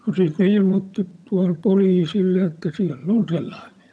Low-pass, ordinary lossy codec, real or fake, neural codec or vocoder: none; none; fake; vocoder, 22.05 kHz, 80 mel bands, HiFi-GAN